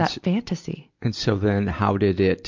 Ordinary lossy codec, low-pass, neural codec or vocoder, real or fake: MP3, 64 kbps; 7.2 kHz; none; real